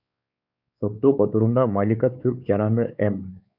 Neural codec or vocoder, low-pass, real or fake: codec, 16 kHz, 4 kbps, X-Codec, WavLM features, trained on Multilingual LibriSpeech; 5.4 kHz; fake